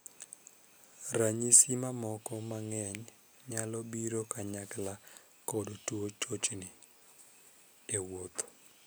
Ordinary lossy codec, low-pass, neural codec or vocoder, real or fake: none; none; none; real